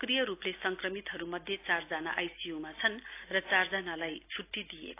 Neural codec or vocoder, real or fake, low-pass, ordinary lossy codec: none; real; 3.6 kHz; AAC, 24 kbps